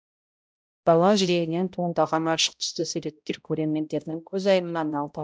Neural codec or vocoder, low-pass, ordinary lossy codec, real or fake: codec, 16 kHz, 0.5 kbps, X-Codec, HuBERT features, trained on balanced general audio; none; none; fake